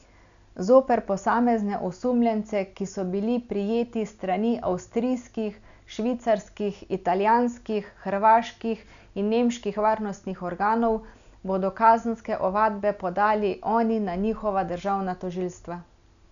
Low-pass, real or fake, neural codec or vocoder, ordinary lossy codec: 7.2 kHz; real; none; none